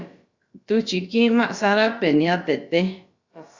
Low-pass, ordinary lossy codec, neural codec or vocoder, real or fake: 7.2 kHz; Opus, 64 kbps; codec, 16 kHz, about 1 kbps, DyCAST, with the encoder's durations; fake